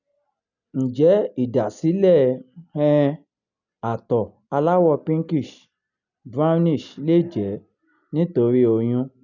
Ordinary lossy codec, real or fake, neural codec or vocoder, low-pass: none; real; none; 7.2 kHz